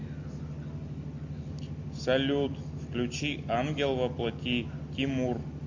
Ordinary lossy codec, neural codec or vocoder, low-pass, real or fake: MP3, 48 kbps; none; 7.2 kHz; real